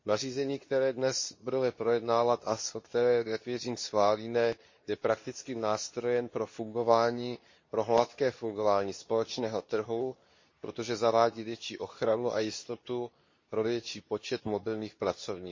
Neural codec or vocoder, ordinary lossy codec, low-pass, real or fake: codec, 24 kHz, 0.9 kbps, WavTokenizer, medium speech release version 2; MP3, 32 kbps; 7.2 kHz; fake